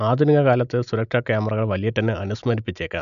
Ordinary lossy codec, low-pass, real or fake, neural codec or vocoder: none; 7.2 kHz; real; none